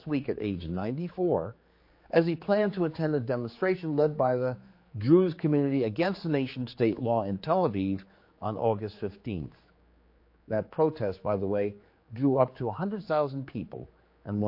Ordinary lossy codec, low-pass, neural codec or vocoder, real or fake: MP3, 32 kbps; 5.4 kHz; codec, 16 kHz, 4 kbps, X-Codec, HuBERT features, trained on general audio; fake